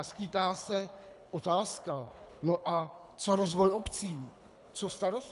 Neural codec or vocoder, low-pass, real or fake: codec, 24 kHz, 3 kbps, HILCodec; 10.8 kHz; fake